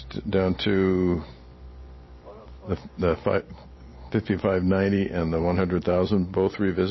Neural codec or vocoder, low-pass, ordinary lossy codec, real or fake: none; 7.2 kHz; MP3, 24 kbps; real